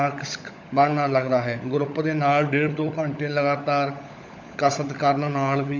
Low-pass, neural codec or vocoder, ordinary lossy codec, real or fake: 7.2 kHz; codec, 16 kHz, 16 kbps, FunCodec, trained on LibriTTS, 50 frames a second; MP3, 48 kbps; fake